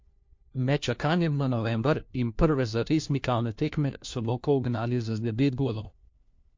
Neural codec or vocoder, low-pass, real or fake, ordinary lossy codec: codec, 16 kHz, 1 kbps, FunCodec, trained on LibriTTS, 50 frames a second; 7.2 kHz; fake; MP3, 48 kbps